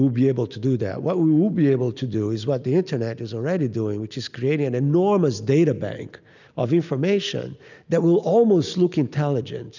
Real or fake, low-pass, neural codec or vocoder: real; 7.2 kHz; none